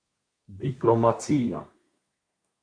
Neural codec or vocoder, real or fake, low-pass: codec, 24 kHz, 1 kbps, SNAC; fake; 9.9 kHz